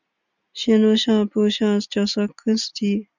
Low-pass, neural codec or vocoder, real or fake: 7.2 kHz; none; real